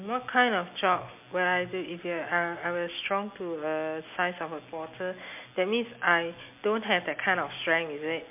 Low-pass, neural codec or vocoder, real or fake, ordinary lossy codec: 3.6 kHz; none; real; MP3, 32 kbps